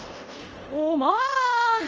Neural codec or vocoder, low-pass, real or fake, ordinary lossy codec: codec, 24 kHz, 0.5 kbps, DualCodec; 7.2 kHz; fake; Opus, 24 kbps